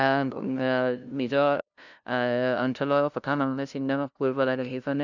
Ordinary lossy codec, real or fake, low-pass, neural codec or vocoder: none; fake; 7.2 kHz; codec, 16 kHz, 0.5 kbps, FunCodec, trained on LibriTTS, 25 frames a second